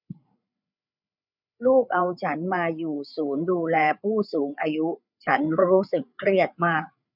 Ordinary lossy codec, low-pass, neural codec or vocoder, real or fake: none; 5.4 kHz; codec, 16 kHz, 8 kbps, FreqCodec, larger model; fake